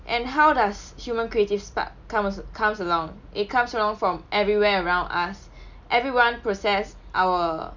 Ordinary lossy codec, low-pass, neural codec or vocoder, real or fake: none; 7.2 kHz; none; real